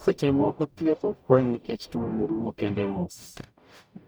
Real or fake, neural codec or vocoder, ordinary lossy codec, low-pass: fake; codec, 44.1 kHz, 0.9 kbps, DAC; none; none